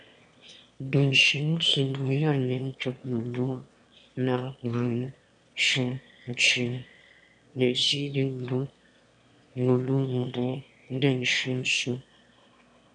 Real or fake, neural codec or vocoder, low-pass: fake; autoencoder, 22.05 kHz, a latent of 192 numbers a frame, VITS, trained on one speaker; 9.9 kHz